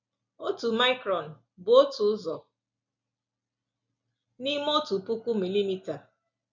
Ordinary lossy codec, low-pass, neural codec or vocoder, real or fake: none; 7.2 kHz; none; real